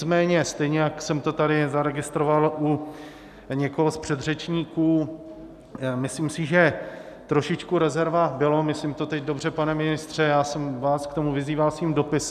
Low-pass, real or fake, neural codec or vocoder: 14.4 kHz; real; none